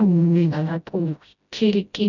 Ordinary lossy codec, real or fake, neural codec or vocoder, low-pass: none; fake; codec, 16 kHz, 0.5 kbps, FreqCodec, smaller model; 7.2 kHz